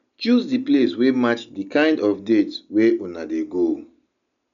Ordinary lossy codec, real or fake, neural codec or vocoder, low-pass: none; real; none; 7.2 kHz